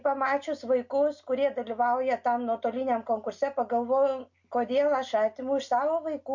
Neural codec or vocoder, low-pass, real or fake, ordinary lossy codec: none; 7.2 kHz; real; MP3, 48 kbps